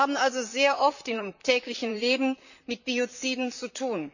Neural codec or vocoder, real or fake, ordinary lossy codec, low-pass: vocoder, 44.1 kHz, 128 mel bands, Pupu-Vocoder; fake; none; 7.2 kHz